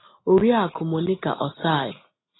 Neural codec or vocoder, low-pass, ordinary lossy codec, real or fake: none; 7.2 kHz; AAC, 16 kbps; real